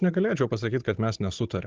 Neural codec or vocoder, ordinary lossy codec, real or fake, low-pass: codec, 16 kHz, 16 kbps, FunCodec, trained on Chinese and English, 50 frames a second; Opus, 16 kbps; fake; 7.2 kHz